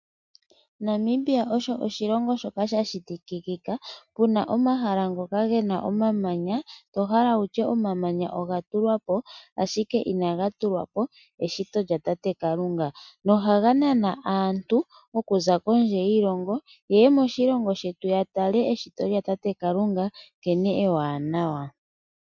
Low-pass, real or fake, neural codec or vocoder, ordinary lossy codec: 7.2 kHz; real; none; MP3, 64 kbps